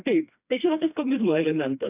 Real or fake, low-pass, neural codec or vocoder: fake; 3.6 kHz; codec, 16 kHz, 2 kbps, FreqCodec, smaller model